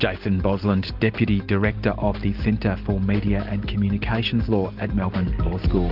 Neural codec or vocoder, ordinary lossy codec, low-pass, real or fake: none; Opus, 32 kbps; 5.4 kHz; real